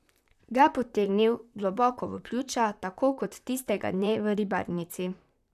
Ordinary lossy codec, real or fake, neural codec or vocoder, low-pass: none; fake; vocoder, 44.1 kHz, 128 mel bands, Pupu-Vocoder; 14.4 kHz